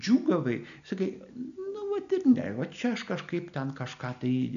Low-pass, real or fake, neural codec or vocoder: 7.2 kHz; real; none